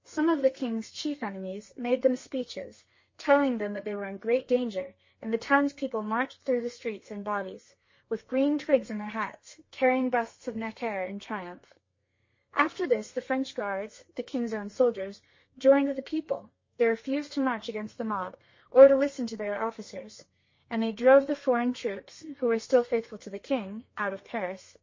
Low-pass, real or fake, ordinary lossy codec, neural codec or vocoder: 7.2 kHz; fake; MP3, 32 kbps; codec, 32 kHz, 1.9 kbps, SNAC